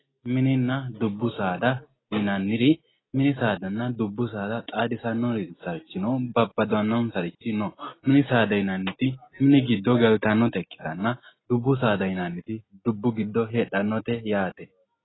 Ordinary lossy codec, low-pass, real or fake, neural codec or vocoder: AAC, 16 kbps; 7.2 kHz; real; none